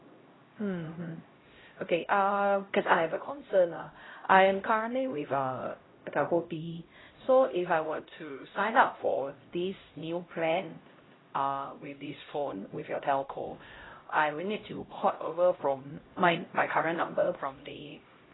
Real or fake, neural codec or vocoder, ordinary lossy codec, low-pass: fake; codec, 16 kHz, 0.5 kbps, X-Codec, HuBERT features, trained on LibriSpeech; AAC, 16 kbps; 7.2 kHz